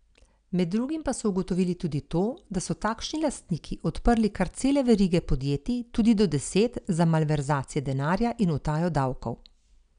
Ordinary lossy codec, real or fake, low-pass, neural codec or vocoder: none; real; 9.9 kHz; none